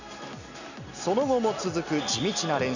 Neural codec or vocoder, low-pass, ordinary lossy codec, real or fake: none; 7.2 kHz; none; real